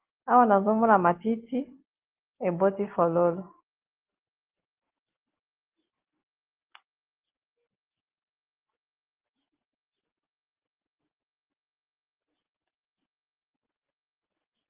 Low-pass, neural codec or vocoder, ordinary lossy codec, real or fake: 3.6 kHz; none; Opus, 16 kbps; real